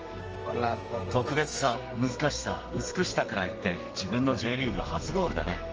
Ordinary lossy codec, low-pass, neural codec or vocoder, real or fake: Opus, 24 kbps; 7.2 kHz; codec, 32 kHz, 1.9 kbps, SNAC; fake